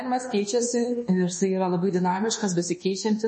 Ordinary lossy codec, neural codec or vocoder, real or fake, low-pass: MP3, 32 kbps; codec, 24 kHz, 1.2 kbps, DualCodec; fake; 10.8 kHz